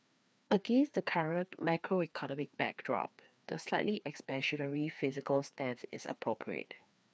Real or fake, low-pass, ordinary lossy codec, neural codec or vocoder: fake; none; none; codec, 16 kHz, 2 kbps, FreqCodec, larger model